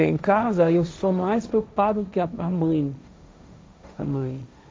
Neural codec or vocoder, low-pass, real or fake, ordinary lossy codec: codec, 16 kHz, 1.1 kbps, Voila-Tokenizer; none; fake; none